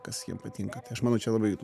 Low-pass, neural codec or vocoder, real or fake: 14.4 kHz; none; real